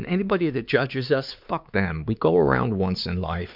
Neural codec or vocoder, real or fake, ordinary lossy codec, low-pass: codec, 16 kHz, 4 kbps, X-Codec, HuBERT features, trained on LibriSpeech; fake; MP3, 48 kbps; 5.4 kHz